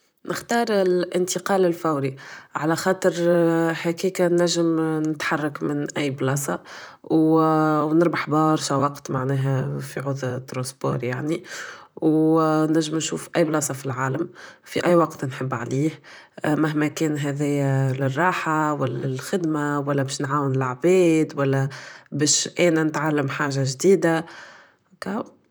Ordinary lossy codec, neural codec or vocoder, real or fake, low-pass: none; vocoder, 44.1 kHz, 128 mel bands, Pupu-Vocoder; fake; none